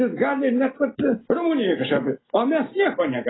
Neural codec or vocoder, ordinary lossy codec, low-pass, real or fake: none; AAC, 16 kbps; 7.2 kHz; real